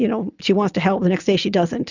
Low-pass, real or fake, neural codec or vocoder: 7.2 kHz; real; none